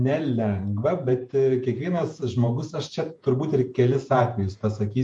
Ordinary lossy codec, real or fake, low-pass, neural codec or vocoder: MP3, 48 kbps; fake; 10.8 kHz; vocoder, 48 kHz, 128 mel bands, Vocos